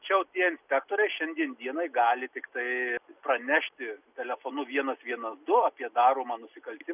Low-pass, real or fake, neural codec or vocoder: 3.6 kHz; real; none